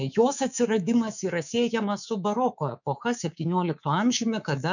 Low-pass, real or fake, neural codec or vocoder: 7.2 kHz; fake; codec, 24 kHz, 3.1 kbps, DualCodec